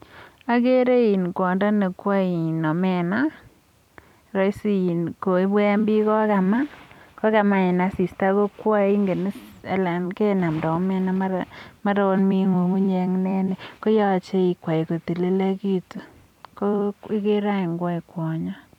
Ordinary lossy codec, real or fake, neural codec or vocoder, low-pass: none; fake; vocoder, 44.1 kHz, 128 mel bands every 256 samples, BigVGAN v2; 19.8 kHz